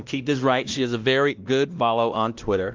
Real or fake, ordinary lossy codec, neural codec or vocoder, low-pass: fake; Opus, 32 kbps; codec, 16 kHz, 1 kbps, X-Codec, WavLM features, trained on Multilingual LibriSpeech; 7.2 kHz